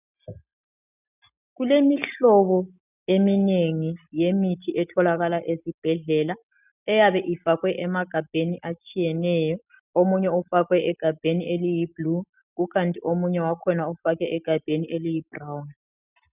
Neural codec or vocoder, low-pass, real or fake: none; 3.6 kHz; real